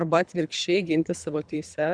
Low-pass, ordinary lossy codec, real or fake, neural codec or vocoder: 9.9 kHz; Opus, 64 kbps; fake; codec, 24 kHz, 3 kbps, HILCodec